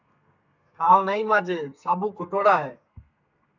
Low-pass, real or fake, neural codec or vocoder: 7.2 kHz; fake; codec, 32 kHz, 1.9 kbps, SNAC